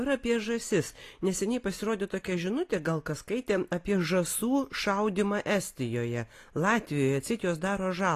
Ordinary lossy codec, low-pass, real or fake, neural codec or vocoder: AAC, 48 kbps; 14.4 kHz; real; none